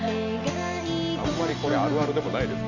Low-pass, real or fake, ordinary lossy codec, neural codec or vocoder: 7.2 kHz; real; none; none